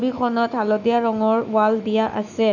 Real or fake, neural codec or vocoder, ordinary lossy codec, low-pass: fake; autoencoder, 48 kHz, 128 numbers a frame, DAC-VAE, trained on Japanese speech; none; 7.2 kHz